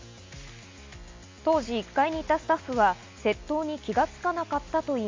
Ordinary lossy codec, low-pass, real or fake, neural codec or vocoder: MP3, 64 kbps; 7.2 kHz; real; none